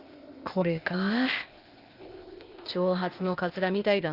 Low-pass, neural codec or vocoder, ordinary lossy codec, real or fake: 5.4 kHz; codec, 16 kHz, 0.8 kbps, ZipCodec; Opus, 32 kbps; fake